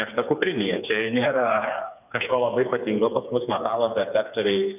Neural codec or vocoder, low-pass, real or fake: codec, 16 kHz, 4 kbps, FreqCodec, smaller model; 3.6 kHz; fake